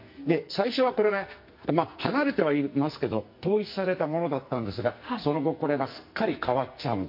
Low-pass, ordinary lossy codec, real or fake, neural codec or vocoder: 5.4 kHz; MP3, 32 kbps; fake; codec, 44.1 kHz, 2.6 kbps, SNAC